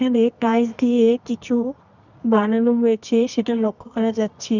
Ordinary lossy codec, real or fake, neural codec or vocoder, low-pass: none; fake; codec, 24 kHz, 0.9 kbps, WavTokenizer, medium music audio release; 7.2 kHz